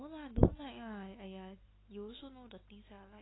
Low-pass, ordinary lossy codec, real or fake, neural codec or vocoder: 7.2 kHz; AAC, 16 kbps; real; none